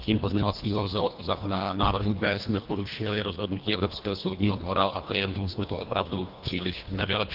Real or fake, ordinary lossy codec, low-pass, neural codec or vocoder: fake; Opus, 24 kbps; 5.4 kHz; codec, 24 kHz, 1.5 kbps, HILCodec